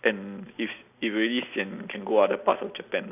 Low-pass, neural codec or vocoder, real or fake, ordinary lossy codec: 3.6 kHz; none; real; none